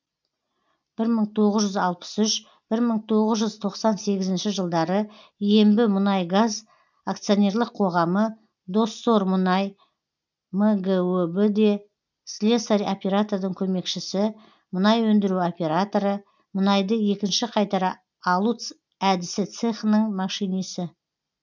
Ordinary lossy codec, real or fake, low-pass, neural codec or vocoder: none; real; 7.2 kHz; none